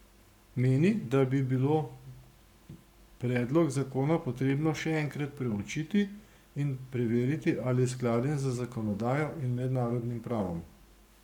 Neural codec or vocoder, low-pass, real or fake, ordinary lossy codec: codec, 44.1 kHz, 7.8 kbps, Pupu-Codec; 19.8 kHz; fake; none